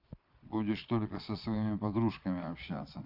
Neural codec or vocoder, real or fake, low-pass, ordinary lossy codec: vocoder, 22.05 kHz, 80 mel bands, Vocos; fake; 5.4 kHz; none